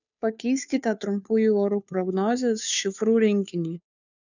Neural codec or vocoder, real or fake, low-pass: codec, 16 kHz, 2 kbps, FunCodec, trained on Chinese and English, 25 frames a second; fake; 7.2 kHz